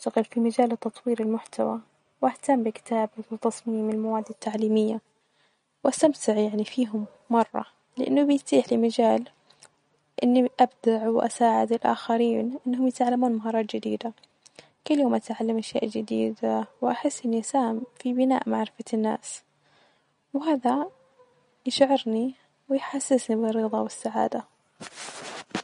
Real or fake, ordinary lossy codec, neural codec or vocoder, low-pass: real; MP3, 48 kbps; none; 10.8 kHz